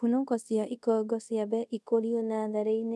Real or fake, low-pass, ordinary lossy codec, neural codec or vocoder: fake; none; none; codec, 24 kHz, 0.5 kbps, DualCodec